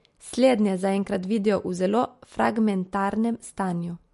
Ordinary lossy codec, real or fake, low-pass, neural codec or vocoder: MP3, 48 kbps; real; 14.4 kHz; none